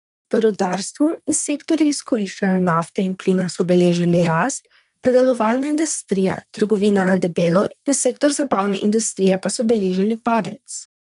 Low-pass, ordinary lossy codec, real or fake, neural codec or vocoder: 10.8 kHz; none; fake; codec, 24 kHz, 1 kbps, SNAC